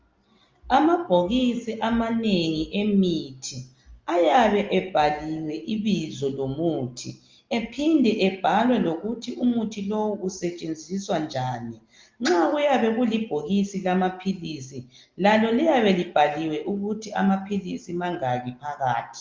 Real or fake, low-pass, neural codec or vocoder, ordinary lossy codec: real; 7.2 kHz; none; Opus, 32 kbps